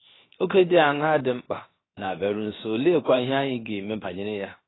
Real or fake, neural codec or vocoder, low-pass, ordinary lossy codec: fake; codec, 16 kHz, 0.7 kbps, FocalCodec; 7.2 kHz; AAC, 16 kbps